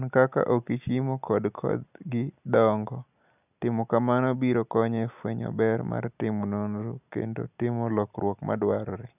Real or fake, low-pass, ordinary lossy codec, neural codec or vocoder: real; 3.6 kHz; none; none